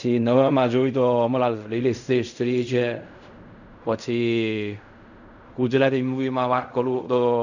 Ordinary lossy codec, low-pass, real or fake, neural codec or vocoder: none; 7.2 kHz; fake; codec, 16 kHz in and 24 kHz out, 0.4 kbps, LongCat-Audio-Codec, fine tuned four codebook decoder